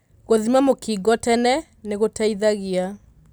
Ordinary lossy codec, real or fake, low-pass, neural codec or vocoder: none; real; none; none